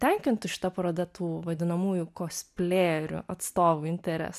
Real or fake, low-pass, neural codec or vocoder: real; 14.4 kHz; none